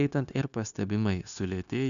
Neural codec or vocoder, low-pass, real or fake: codec, 16 kHz, 0.9 kbps, LongCat-Audio-Codec; 7.2 kHz; fake